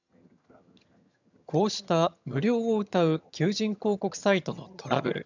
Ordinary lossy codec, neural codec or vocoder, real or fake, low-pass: none; vocoder, 22.05 kHz, 80 mel bands, HiFi-GAN; fake; 7.2 kHz